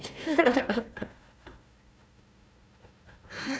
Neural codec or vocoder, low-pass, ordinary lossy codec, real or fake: codec, 16 kHz, 1 kbps, FunCodec, trained on Chinese and English, 50 frames a second; none; none; fake